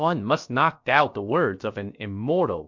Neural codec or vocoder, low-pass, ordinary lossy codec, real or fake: codec, 16 kHz, about 1 kbps, DyCAST, with the encoder's durations; 7.2 kHz; MP3, 48 kbps; fake